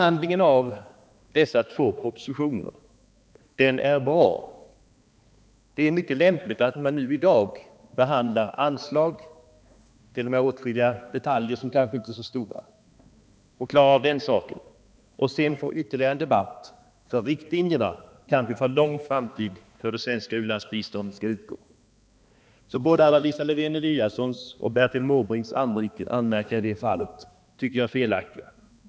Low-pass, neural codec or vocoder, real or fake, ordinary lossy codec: none; codec, 16 kHz, 2 kbps, X-Codec, HuBERT features, trained on balanced general audio; fake; none